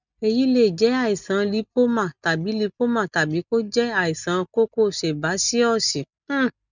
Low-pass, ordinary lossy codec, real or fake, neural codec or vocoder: 7.2 kHz; none; real; none